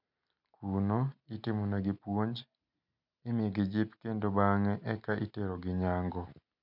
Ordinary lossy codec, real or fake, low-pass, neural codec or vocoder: none; real; 5.4 kHz; none